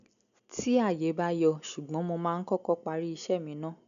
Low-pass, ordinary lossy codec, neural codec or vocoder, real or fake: 7.2 kHz; none; none; real